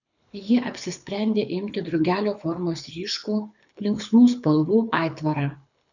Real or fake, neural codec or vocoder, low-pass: fake; codec, 24 kHz, 6 kbps, HILCodec; 7.2 kHz